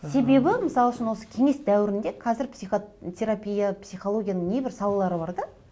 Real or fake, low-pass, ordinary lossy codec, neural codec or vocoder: real; none; none; none